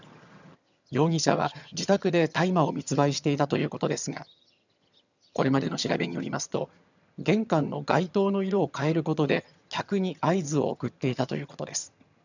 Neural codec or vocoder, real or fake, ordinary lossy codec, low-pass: vocoder, 22.05 kHz, 80 mel bands, HiFi-GAN; fake; none; 7.2 kHz